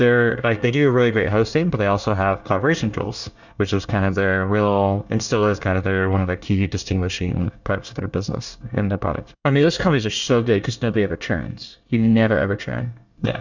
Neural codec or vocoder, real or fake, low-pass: codec, 24 kHz, 1 kbps, SNAC; fake; 7.2 kHz